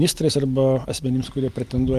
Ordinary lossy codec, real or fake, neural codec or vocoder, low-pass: Opus, 32 kbps; real; none; 14.4 kHz